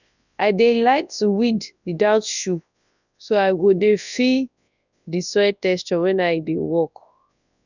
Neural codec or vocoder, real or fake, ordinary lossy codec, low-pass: codec, 24 kHz, 0.9 kbps, WavTokenizer, large speech release; fake; none; 7.2 kHz